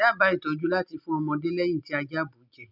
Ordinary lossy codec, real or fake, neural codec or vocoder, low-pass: none; real; none; 5.4 kHz